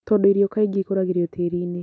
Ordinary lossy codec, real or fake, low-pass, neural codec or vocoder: none; real; none; none